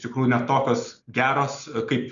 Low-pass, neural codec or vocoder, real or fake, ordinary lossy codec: 7.2 kHz; none; real; MP3, 96 kbps